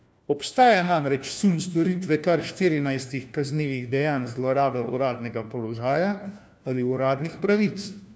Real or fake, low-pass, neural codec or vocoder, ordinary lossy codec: fake; none; codec, 16 kHz, 1 kbps, FunCodec, trained on LibriTTS, 50 frames a second; none